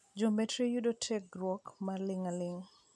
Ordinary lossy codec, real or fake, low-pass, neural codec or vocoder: none; real; none; none